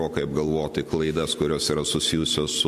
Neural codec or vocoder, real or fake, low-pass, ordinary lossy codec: none; real; 14.4 kHz; MP3, 64 kbps